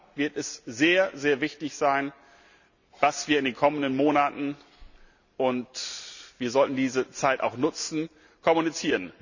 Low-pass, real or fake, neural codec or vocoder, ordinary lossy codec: 7.2 kHz; real; none; none